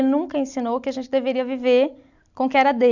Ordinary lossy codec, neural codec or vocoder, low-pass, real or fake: none; none; 7.2 kHz; real